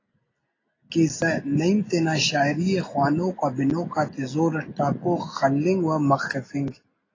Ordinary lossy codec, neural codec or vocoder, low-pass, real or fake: AAC, 32 kbps; none; 7.2 kHz; real